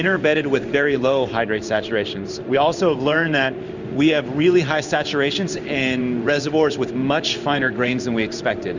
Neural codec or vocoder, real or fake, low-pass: codec, 16 kHz in and 24 kHz out, 1 kbps, XY-Tokenizer; fake; 7.2 kHz